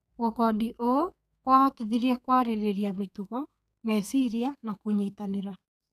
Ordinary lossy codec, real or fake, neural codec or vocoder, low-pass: none; fake; codec, 32 kHz, 1.9 kbps, SNAC; 14.4 kHz